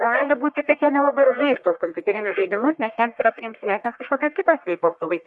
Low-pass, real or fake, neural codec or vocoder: 10.8 kHz; fake; codec, 44.1 kHz, 1.7 kbps, Pupu-Codec